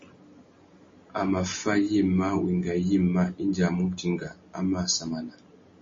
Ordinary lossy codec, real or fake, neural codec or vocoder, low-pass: MP3, 32 kbps; real; none; 7.2 kHz